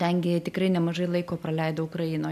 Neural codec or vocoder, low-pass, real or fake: none; 14.4 kHz; real